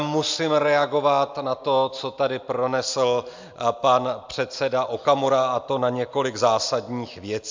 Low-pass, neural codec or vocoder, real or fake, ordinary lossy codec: 7.2 kHz; none; real; MP3, 64 kbps